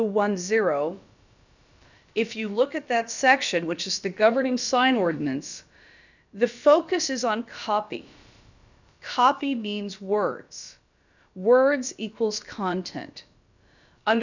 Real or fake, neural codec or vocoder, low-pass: fake; codec, 16 kHz, about 1 kbps, DyCAST, with the encoder's durations; 7.2 kHz